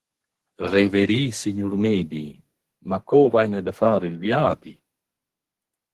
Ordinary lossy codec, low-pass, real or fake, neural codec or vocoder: Opus, 16 kbps; 14.4 kHz; fake; codec, 32 kHz, 1.9 kbps, SNAC